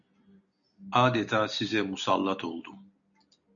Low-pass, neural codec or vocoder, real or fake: 7.2 kHz; none; real